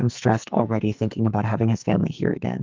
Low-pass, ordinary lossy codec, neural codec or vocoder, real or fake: 7.2 kHz; Opus, 24 kbps; codec, 44.1 kHz, 2.6 kbps, SNAC; fake